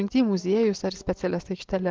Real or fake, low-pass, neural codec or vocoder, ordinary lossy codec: real; 7.2 kHz; none; Opus, 16 kbps